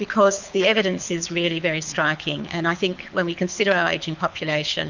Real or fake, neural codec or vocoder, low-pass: fake; codec, 24 kHz, 6 kbps, HILCodec; 7.2 kHz